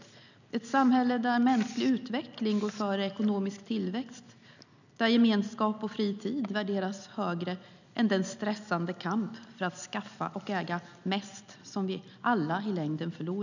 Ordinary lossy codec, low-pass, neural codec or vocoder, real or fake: none; 7.2 kHz; none; real